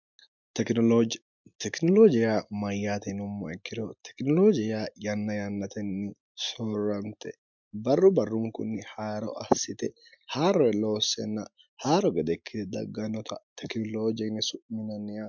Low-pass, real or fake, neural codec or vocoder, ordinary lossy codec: 7.2 kHz; real; none; MP3, 64 kbps